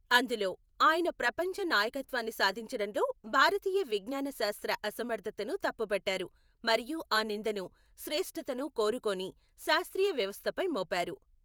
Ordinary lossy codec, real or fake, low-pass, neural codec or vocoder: none; fake; none; vocoder, 48 kHz, 128 mel bands, Vocos